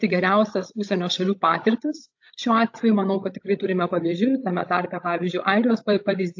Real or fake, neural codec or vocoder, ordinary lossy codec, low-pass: fake; codec, 16 kHz, 16 kbps, FreqCodec, larger model; AAC, 48 kbps; 7.2 kHz